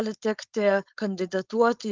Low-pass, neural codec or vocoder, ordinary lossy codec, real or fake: 7.2 kHz; codec, 16 kHz, 4.8 kbps, FACodec; Opus, 24 kbps; fake